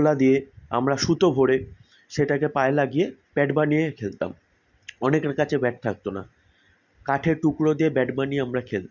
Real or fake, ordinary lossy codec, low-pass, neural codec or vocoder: real; none; 7.2 kHz; none